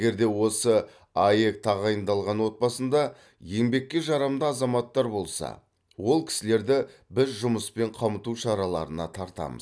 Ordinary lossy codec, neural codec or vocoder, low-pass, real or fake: none; none; none; real